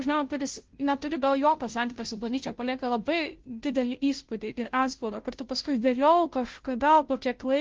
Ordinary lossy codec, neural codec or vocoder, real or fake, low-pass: Opus, 16 kbps; codec, 16 kHz, 0.5 kbps, FunCodec, trained on Chinese and English, 25 frames a second; fake; 7.2 kHz